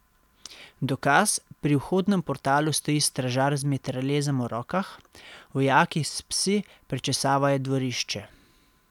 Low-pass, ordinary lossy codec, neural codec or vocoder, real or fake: 19.8 kHz; none; none; real